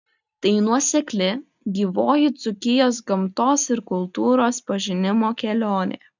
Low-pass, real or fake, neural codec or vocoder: 7.2 kHz; real; none